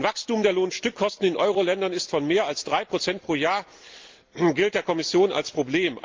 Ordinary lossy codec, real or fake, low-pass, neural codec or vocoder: Opus, 32 kbps; real; 7.2 kHz; none